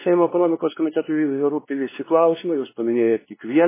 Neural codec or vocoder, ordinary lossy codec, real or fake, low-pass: codec, 16 kHz, 2 kbps, X-Codec, WavLM features, trained on Multilingual LibriSpeech; MP3, 16 kbps; fake; 3.6 kHz